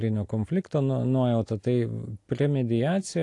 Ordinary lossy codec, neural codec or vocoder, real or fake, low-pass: AAC, 48 kbps; none; real; 10.8 kHz